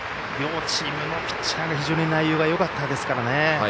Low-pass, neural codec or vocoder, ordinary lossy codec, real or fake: none; none; none; real